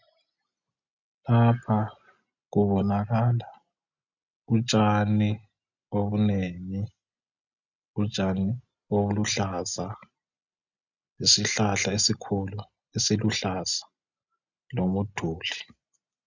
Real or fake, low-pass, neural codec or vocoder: real; 7.2 kHz; none